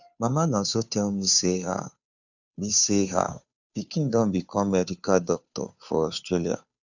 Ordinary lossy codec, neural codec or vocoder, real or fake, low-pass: none; codec, 16 kHz, 2 kbps, FunCodec, trained on Chinese and English, 25 frames a second; fake; 7.2 kHz